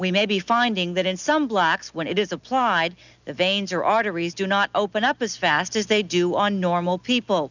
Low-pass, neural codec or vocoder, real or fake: 7.2 kHz; none; real